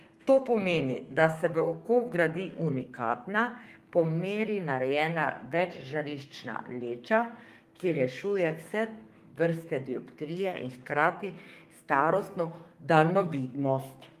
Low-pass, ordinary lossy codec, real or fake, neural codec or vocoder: 14.4 kHz; Opus, 32 kbps; fake; codec, 32 kHz, 1.9 kbps, SNAC